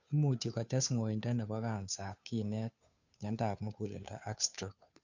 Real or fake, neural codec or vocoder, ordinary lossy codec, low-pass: fake; codec, 16 kHz, 2 kbps, FunCodec, trained on Chinese and English, 25 frames a second; none; 7.2 kHz